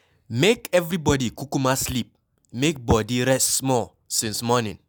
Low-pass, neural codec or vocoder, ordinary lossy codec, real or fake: none; none; none; real